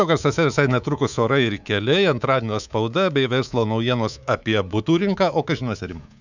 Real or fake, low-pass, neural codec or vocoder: fake; 7.2 kHz; codec, 24 kHz, 3.1 kbps, DualCodec